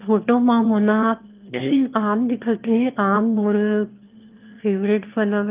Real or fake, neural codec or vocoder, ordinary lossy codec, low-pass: fake; autoencoder, 22.05 kHz, a latent of 192 numbers a frame, VITS, trained on one speaker; Opus, 32 kbps; 3.6 kHz